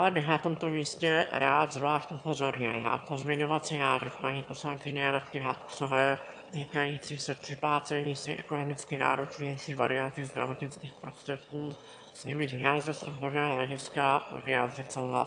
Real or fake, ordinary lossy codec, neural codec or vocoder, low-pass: fake; AAC, 64 kbps; autoencoder, 22.05 kHz, a latent of 192 numbers a frame, VITS, trained on one speaker; 9.9 kHz